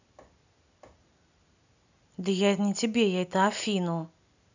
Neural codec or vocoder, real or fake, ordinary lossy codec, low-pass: none; real; none; 7.2 kHz